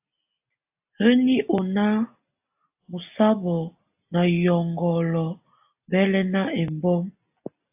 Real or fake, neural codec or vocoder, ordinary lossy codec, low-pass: real; none; AAC, 32 kbps; 3.6 kHz